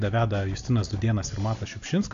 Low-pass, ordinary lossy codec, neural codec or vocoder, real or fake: 7.2 kHz; AAC, 64 kbps; none; real